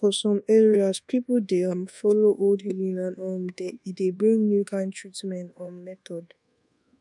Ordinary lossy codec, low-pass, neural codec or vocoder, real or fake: none; 10.8 kHz; codec, 24 kHz, 1.2 kbps, DualCodec; fake